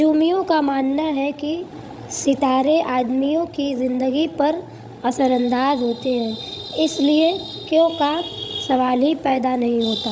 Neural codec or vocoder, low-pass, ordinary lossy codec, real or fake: codec, 16 kHz, 16 kbps, FreqCodec, larger model; none; none; fake